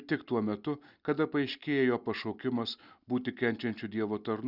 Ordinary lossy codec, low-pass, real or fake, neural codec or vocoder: Opus, 64 kbps; 5.4 kHz; real; none